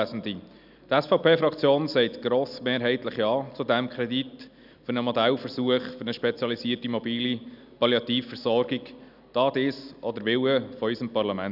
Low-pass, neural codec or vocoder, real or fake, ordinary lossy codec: 5.4 kHz; none; real; none